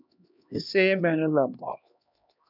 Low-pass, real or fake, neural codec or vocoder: 5.4 kHz; fake; codec, 16 kHz, 1 kbps, X-Codec, HuBERT features, trained on LibriSpeech